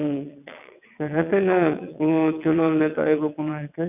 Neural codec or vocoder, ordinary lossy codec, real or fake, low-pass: vocoder, 22.05 kHz, 80 mel bands, WaveNeXt; none; fake; 3.6 kHz